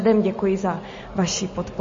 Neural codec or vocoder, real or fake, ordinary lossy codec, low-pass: none; real; MP3, 32 kbps; 7.2 kHz